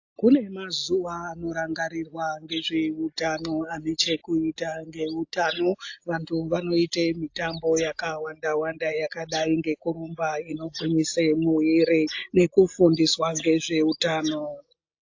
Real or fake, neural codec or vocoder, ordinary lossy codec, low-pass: real; none; AAC, 48 kbps; 7.2 kHz